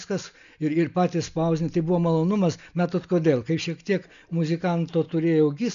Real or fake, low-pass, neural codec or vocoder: real; 7.2 kHz; none